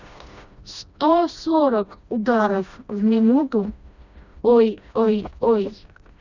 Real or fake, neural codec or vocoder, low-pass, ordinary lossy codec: fake; codec, 16 kHz, 1 kbps, FreqCodec, smaller model; 7.2 kHz; none